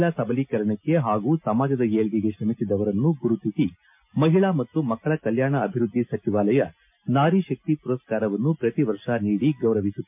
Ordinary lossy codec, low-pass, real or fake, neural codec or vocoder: MP3, 32 kbps; 3.6 kHz; real; none